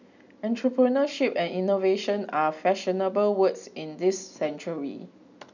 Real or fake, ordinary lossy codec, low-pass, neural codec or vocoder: real; none; 7.2 kHz; none